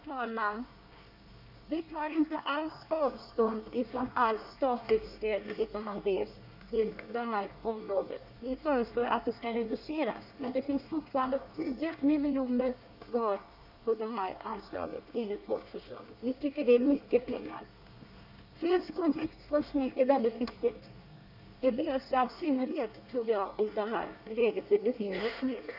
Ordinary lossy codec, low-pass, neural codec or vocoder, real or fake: none; 5.4 kHz; codec, 24 kHz, 1 kbps, SNAC; fake